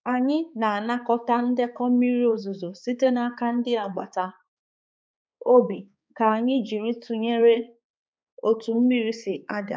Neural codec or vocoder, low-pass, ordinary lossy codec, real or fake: codec, 16 kHz, 4 kbps, X-Codec, HuBERT features, trained on balanced general audio; none; none; fake